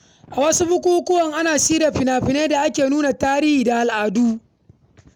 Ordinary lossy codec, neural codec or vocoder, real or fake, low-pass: none; none; real; 19.8 kHz